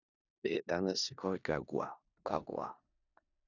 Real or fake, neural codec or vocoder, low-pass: fake; codec, 16 kHz in and 24 kHz out, 0.4 kbps, LongCat-Audio-Codec, four codebook decoder; 7.2 kHz